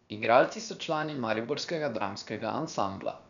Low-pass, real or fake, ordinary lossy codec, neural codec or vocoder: 7.2 kHz; fake; none; codec, 16 kHz, about 1 kbps, DyCAST, with the encoder's durations